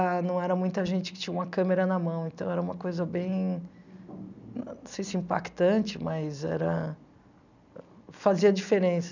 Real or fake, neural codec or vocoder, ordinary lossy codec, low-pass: real; none; none; 7.2 kHz